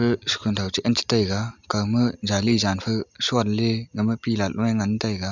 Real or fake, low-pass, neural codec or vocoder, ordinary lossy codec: real; 7.2 kHz; none; none